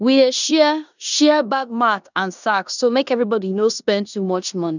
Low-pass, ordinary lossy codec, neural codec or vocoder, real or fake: 7.2 kHz; none; codec, 16 kHz in and 24 kHz out, 0.9 kbps, LongCat-Audio-Codec, four codebook decoder; fake